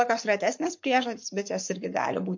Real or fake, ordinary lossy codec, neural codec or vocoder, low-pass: fake; MP3, 48 kbps; codec, 44.1 kHz, 7.8 kbps, Pupu-Codec; 7.2 kHz